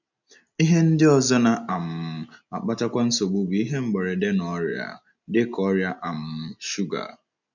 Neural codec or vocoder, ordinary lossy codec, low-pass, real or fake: none; none; 7.2 kHz; real